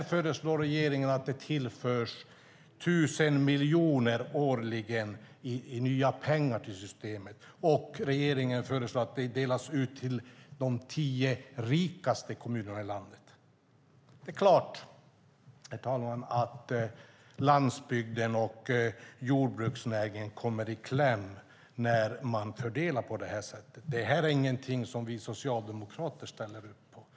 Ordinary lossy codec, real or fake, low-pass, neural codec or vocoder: none; real; none; none